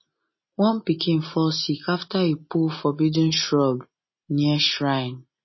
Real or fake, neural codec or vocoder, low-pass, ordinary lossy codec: real; none; 7.2 kHz; MP3, 24 kbps